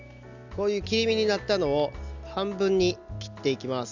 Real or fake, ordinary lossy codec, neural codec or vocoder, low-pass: real; none; none; 7.2 kHz